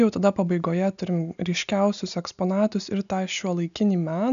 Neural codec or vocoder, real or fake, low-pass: none; real; 7.2 kHz